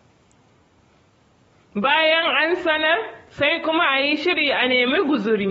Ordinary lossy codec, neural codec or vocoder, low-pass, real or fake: AAC, 24 kbps; codec, 44.1 kHz, 7.8 kbps, DAC; 19.8 kHz; fake